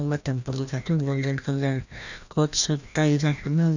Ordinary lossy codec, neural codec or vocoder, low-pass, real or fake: none; codec, 16 kHz, 1 kbps, FreqCodec, larger model; 7.2 kHz; fake